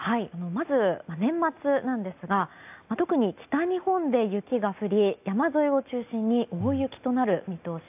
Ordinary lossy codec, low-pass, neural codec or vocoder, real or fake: AAC, 32 kbps; 3.6 kHz; none; real